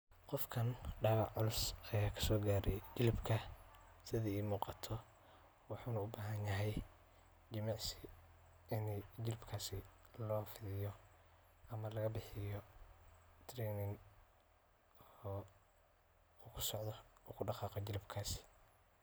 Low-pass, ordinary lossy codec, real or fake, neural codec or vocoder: none; none; real; none